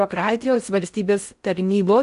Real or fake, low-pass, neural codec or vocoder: fake; 10.8 kHz; codec, 16 kHz in and 24 kHz out, 0.6 kbps, FocalCodec, streaming, 4096 codes